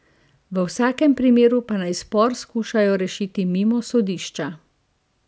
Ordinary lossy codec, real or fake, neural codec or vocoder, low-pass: none; real; none; none